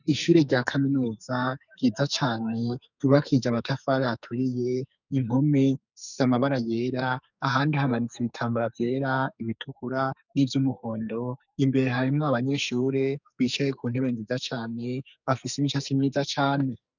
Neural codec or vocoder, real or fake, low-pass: codec, 44.1 kHz, 2.6 kbps, SNAC; fake; 7.2 kHz